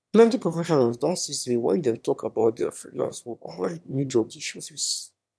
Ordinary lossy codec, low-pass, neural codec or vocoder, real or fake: none; none; autoencoder, 22.05 kHz, a latent of 192 numbers a frame, VITS, trained on one speaker; fake